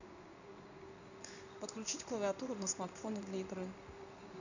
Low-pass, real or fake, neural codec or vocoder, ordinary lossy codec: 7.2 kHz; fake; codec, 16 kHz in and 24 kHz out, 1 kbps, XY-Tokenizer; none